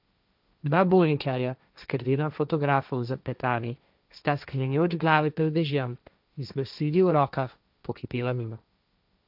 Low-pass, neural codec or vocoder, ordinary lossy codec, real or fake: 5.4 kHz; codec, 16 kHz, 1.1 kbps, Voila-Tokenizer; none; fake